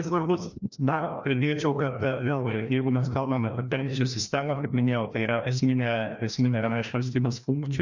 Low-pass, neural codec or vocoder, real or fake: 7.2 kHz; codec, 16 kHz, 1 kbps, FreqCodec, larger model; fake